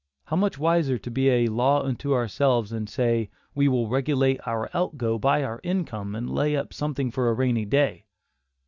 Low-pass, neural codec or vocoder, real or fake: 7.2 kHz; none; real